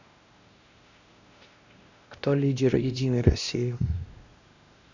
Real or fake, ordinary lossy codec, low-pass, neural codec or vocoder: fake; none; 7.2 kHz; codec, 16 kHz, 1 kbps, X-Codec, WavLM features, trained on Multilingual LibriSpeech